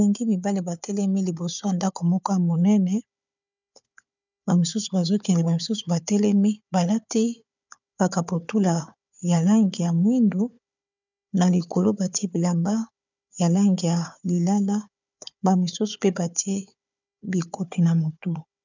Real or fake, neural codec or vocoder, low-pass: fake; codec, 16 kHz, 4 kbps, FunCodec, trained on Chinese and English, 50 frames a second; 7.2 kHz